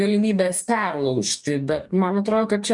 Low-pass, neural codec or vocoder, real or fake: 10.8 kHz; codec, 44.1 kHz, 2.6 kbps, DAC; fake